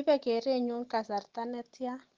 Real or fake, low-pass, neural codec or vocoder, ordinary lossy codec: real; 7.2 kHz; none; Opus, 16 kbps